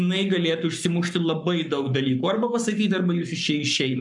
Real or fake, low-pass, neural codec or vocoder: fake; 10.8 kHz; codec, 44.1 kHz, 7.8 kbps, Pupu-Codec